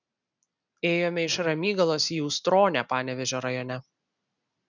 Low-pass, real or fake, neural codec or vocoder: 7.2 kHz; real; none